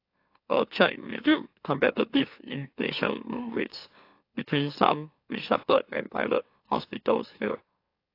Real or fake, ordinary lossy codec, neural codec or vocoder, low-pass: fake; AAC, 32 kbps; autoencoder, 44.1 kHz, a latent of 192 numbers a frame, MeloTTS; 5.4 kHz